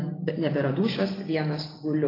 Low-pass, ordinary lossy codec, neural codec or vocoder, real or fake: 5.4 kHz; AAC, 24 kbps; none; real